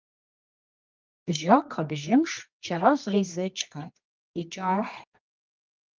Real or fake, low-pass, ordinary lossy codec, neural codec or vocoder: fake; 7.2 kHz; Opus, 24 kbps; codec, 24 kHz, 0.9 kbps, WavTokenizer, medium music audio release